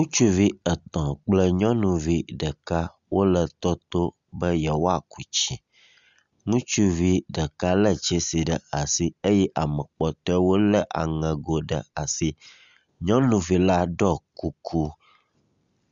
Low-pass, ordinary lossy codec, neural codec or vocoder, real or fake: 7.2 kHz; Opus, 64 kbps; none; real